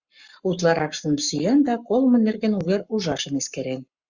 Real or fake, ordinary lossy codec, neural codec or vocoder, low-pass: fake; Opus, 64 kbps; codec, 44.1 kHz, 7.8 kbps, Pupu-Codec; 7.2 kHz